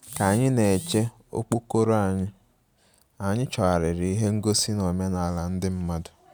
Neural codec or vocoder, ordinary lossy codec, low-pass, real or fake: none; none; none; real